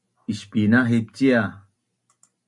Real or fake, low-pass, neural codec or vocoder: real; 10.8 kHz; none